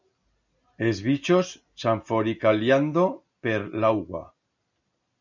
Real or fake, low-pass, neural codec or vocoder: real; 7.2 kHz; none